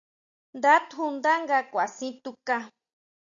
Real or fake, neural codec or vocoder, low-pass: real; none; 7.2 kHz